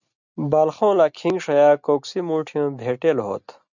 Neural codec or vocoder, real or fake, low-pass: none; real; 7.2 kHz